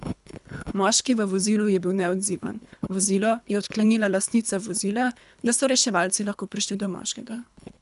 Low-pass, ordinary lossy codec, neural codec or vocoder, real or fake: 10.8 kHz; none; codec, 24 kHz, 3 kbps, HILCodec; fake